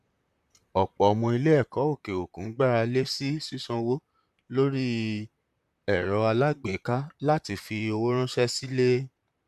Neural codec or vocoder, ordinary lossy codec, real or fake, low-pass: vocoder, 44.1 kHz, 128 mel bands, Pupu-Vocoder; MP3, 96 kbps; fake; 14.4 kHz